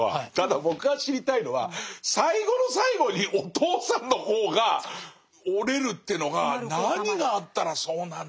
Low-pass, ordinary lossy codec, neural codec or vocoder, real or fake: none; none; none; real